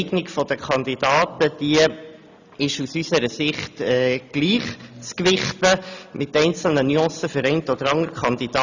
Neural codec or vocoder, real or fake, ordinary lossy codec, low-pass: none; real; none; 7.2 kHz